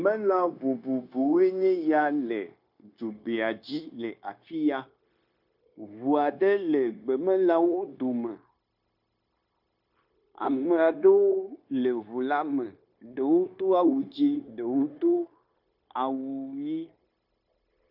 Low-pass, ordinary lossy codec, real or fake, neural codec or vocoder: 5.4 kHz; AAC, 48 kbps; fake; codec, 16 kHz, 0.9 kbps, LongCat-Audio-Codec